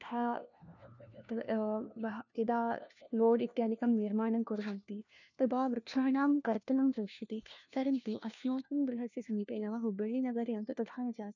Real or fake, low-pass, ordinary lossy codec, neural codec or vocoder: fake; 7.2 kHz; none; codec, 16 kHz, 1 kbps, FunCodec, trained on LibriTTS, 50 frames a second